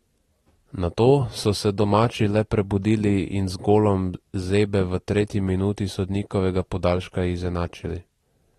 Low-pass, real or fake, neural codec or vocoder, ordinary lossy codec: 19.8 kHz; real; none; AAC, 32 kbps